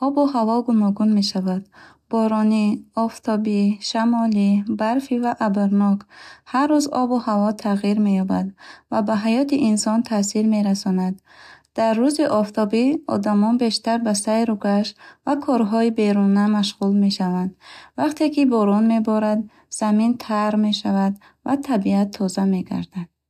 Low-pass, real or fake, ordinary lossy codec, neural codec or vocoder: 14.4 kHz; real; AAC, 96 kbps; none